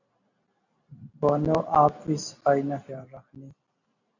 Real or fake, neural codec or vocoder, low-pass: real; none; 7.2 kHz